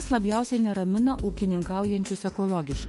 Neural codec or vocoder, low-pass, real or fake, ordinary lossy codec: autoencoder, 48 kHz, 32 numbers a frame, DAC-VAE, trained on Japanese speech; 14.4 kHz; fake; MP3, 48 kbps